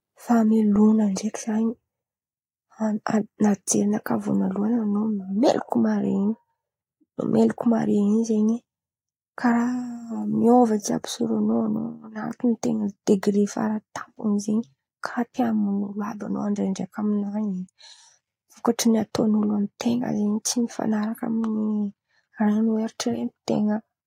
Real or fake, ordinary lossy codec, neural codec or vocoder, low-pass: real; AAC, 48 kbps; none; 19.8 kHz